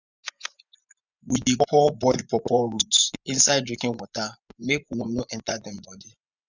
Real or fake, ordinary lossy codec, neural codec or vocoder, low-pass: fake; none; vocoder, 24 kHz, 100 mel bands, Vocos; 7.2 kHz